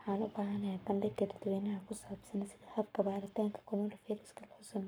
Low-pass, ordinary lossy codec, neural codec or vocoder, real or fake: none; none; codec, 44.1 kHz, 7.8 kbps, Pupu-Codec; fake